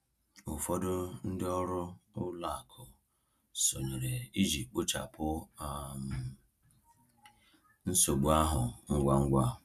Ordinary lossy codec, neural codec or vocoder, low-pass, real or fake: none; none; 14.4 kHz; real